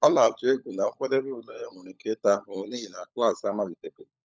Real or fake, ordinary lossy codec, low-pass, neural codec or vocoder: fake; none; none; codec, 16 kHz, 16 kbps, FunCodec, trained on LibriTTS, 50 frames a second